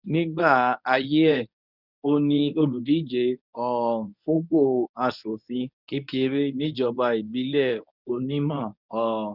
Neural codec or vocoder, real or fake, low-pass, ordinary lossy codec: codec, 24 kHz, 0.9 kbps, WavTokenizer, medium speech release version 1; fake; 5.4 kHz; none